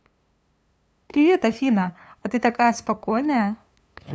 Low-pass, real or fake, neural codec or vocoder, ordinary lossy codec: none; fake; codec, 16 kHz, 2 kbps, FunCodec, trained on LibriTTS, 25 frames a second; none